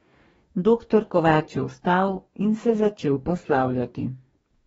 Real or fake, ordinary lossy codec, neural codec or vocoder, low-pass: fake; AAC, 24 kbps; codec, 44.1 kHz, 2.6 kbps, DAC; 19.8 kHz